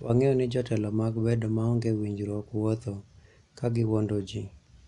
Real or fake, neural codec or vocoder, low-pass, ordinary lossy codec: real; none; 10.8 kHz; none